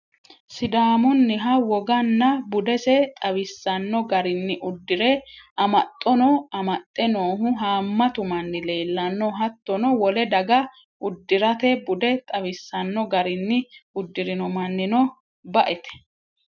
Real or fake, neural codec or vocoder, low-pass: real; none; 7.2 kHz